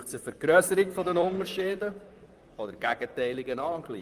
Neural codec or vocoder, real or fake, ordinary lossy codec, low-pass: vocoder, 44.1 kHz, 128 mel bands, Pupu-Vocoder; fake; Opus, 32 kbps; 14.4 kHz